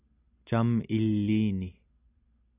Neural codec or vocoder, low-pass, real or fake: none; 3.6 kHz; real